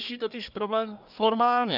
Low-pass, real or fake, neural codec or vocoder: 5.4 kHz; fake; codec, 24 kHz, 1 kbps, SNAC